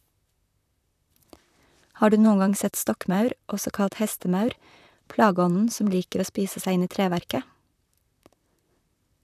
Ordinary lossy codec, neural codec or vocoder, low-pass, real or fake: none; vocoder, 44.1 kHz, 128 mel bands, Pupu-Vocoder; 14.4 kHz; fake